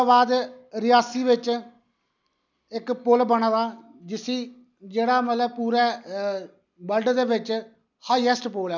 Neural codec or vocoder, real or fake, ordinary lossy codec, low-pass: none; real; none; 7.2 kHz